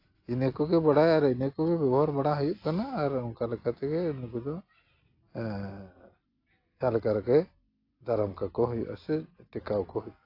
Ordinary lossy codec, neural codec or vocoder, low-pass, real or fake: AAC, 32 kbps; none; 5.4 kHz; real